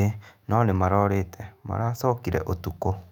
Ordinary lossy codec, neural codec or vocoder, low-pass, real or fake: none; autoencoder, 48 kHz, 128 numbers a frame, DAC-VAE, trained on Japanese speech; 19.8 kHz; fake